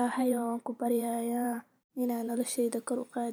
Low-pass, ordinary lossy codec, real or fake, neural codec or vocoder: none; none; fake; vocoder, 44.1 kHz, 128 mel bands every 512 samples, BigVGAN v2